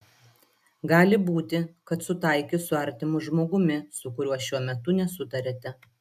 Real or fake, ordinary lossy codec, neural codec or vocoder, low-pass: real; AAC, 96 kbps; none; 14.4 kHz